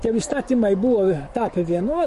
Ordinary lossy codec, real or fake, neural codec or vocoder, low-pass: MP3, 48 kbps; fake; codec, 44.1 kHz, 7.8 kbps, DAC; 14.4 kHz